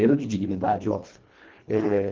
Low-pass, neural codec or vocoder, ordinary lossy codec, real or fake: 7.2 kHz; codec, 24 kHz, 1.5 kbps, HILCodec; Opus, 16 kbps; fake